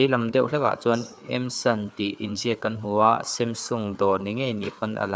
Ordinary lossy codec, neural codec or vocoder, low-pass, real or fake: none; codec, 16 kHz, 4 kbps, FreqCodec, larger model; none; fake